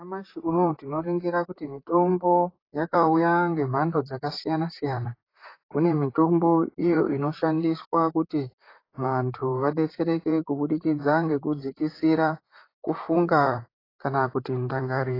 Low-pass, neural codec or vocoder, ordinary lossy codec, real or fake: 5.4 kHz; vocoder, 44.1 kHz, 128 mel bands, Pupu-Vocoder; AAC, 24 kbps; fake